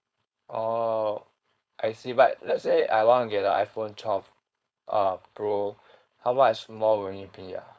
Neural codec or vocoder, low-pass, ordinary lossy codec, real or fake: codec, 16 kHz, 4.8 kbps, FACodec; none; none; fake